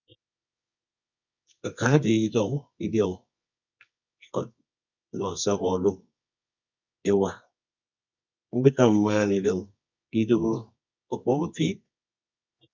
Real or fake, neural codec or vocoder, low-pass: fake; codec, 24 kHz, 0.9 kbps, WavTokenizer, medium music audio release; 7.2 kHz